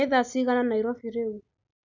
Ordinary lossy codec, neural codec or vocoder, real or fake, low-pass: none; none; real; 7.2 kHz